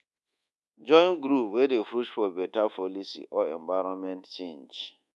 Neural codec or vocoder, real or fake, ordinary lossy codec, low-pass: codec, 24 kHz, 1.2 kbps, DualCodec; fake; none; none